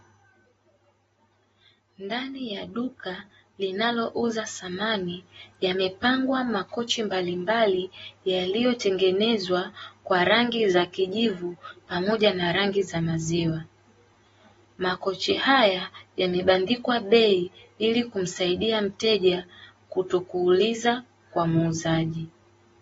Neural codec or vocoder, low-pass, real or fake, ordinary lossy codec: none; 19.8 kHz; real; AAC, 24 kbps